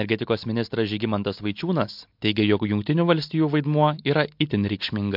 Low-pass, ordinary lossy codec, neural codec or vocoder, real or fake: 5.4 kHz; MP3, 48 kbps; none; real